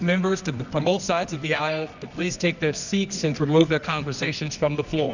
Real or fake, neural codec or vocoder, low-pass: fake; codec, 24 kHz, 0.9 kbps, WavTokenizer, medium music audio release; 7.2 kHz